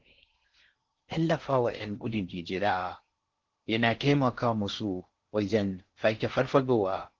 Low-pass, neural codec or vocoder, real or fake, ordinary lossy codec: 7.2 kHz; codec, 16 kHz in and 24 kHz out, 0.6 kbps, FocalCodec, streaming, 4096 codes; fake; Opus, 16 kbps